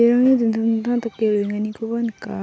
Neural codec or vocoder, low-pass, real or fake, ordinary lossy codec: none; none; real; none